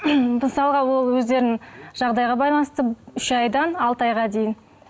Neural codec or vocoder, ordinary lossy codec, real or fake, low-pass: none; none; real; none